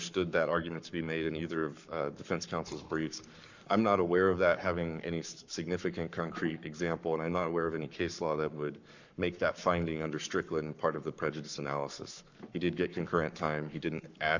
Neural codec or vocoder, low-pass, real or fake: codec, 44.1 kHz, 7.8 kbps, Pupu-Codec; 7.2 kHz; fake